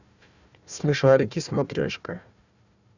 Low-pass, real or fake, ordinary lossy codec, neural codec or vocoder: 7.2 kHz; fake; none; codec, 16 kHz, 1 kbps, FunCodec, trained on Chinese and English, 50 frames a second